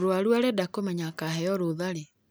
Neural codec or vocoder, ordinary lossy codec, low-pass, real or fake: none; none; none; real